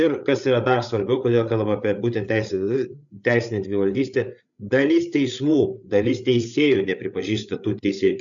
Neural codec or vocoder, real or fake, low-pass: codec, 16 kHz, 8 kbps, FreqCodec, larger model; fake; 7.2 kHz